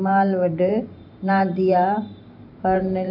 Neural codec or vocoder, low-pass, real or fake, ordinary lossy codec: vocoder, 44.1 kHz, 128 mel bands every 512 samples, BigVGAN v2; 5.4 kHz; fake; none